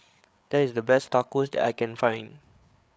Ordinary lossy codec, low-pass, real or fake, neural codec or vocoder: none; none; fake; codec, 16 kHz, 8 kbps, FreqCodec, larger model